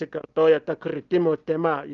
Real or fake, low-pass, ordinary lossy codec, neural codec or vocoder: real; 7.2 kHz; Opus, 32 kbps; none